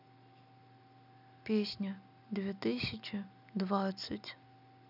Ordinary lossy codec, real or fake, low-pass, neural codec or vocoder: none; real; 5.4 kHz; none